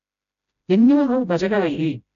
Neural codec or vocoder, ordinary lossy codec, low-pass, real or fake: codec, 16 kHz, 0.5 kbps, FreqCodec, smaller model; none; 7.2 kHz; fake